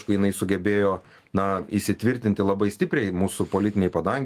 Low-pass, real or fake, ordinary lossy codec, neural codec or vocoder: 14.4 kHz; fake; Opus, 24 kbps; autoencoder, 48 kHz, 128 numbers a frame, DAC-VAE, trained on Japanese speech